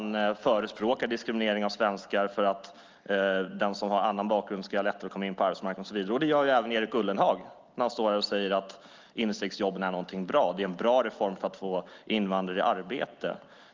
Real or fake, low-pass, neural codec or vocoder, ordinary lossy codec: real; 7.2 kHz; none; Opus, 24 kbps